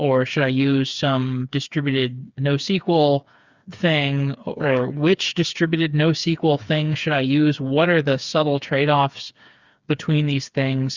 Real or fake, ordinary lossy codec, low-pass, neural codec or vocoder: fake; Opus, 64 kbps; 7.2 kHz; codec, 16 kHz, 4 kbps, FreqCodec, smaller model